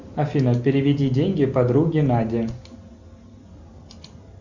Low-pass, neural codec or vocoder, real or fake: 7.2 kHz; none; real